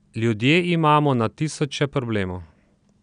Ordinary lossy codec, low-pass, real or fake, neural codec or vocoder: none; 9.9 kHz; real; none